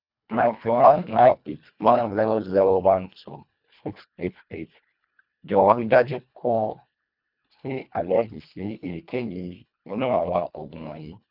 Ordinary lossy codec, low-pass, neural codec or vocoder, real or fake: none; 5.4 kHz; codec, 24 kHz, 1.5 kbps, HILCodec; fake